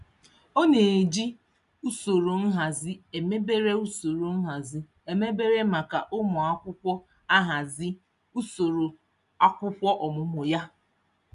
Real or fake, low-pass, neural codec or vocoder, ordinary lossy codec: real; 10.8 kHz; none; none